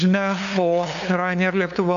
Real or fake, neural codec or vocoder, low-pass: fake; codec, 16 kHz, 2 kbps, FunCodec, trained on LibriTTS, 25 frames a second; 7.2 kHz